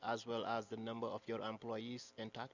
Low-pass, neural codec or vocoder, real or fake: 7.2 kHz; none; real